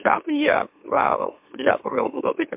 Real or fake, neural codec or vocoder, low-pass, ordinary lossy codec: fake; autoencoder, 44.1 kHz, a latent of 192 numbers a frame, MeloTTS; 3.6 kHz; MP3, 32 kbps